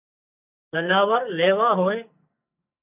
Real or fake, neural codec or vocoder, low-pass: fake; codec, 44.1 kHz, 2.6 kbps, SNAC; 3.6 kHz